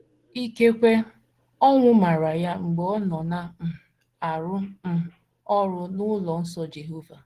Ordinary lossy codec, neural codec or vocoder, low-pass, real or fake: Opus, 16 kbps; none; 14.4 kHz; real